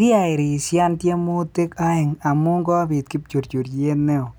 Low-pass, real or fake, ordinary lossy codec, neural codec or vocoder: none; real; none; none